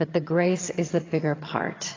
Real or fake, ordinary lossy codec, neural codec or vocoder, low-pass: fake; AAC, 32 kbps; vocoder, 22.05 kHz, 80 mel bands, HiFi-GAN; 7.2 kHz